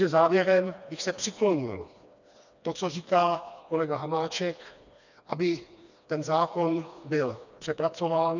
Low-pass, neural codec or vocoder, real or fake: 7.2 kHz; codec, 16 kHz, 2 kbps, FreqCodec, smaller model; fake